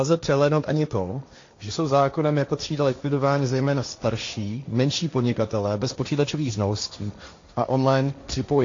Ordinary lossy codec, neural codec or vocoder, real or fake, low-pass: AAC, 48 kbps; codec, 16 kHz, 1.1 kbps, Voila-Tokenizer; fake; 7.2 kHz